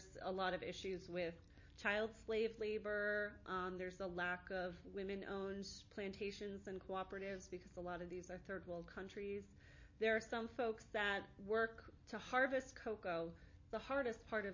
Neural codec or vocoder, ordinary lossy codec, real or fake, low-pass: none; MP3, 32 kbps; real; 7.2 kHz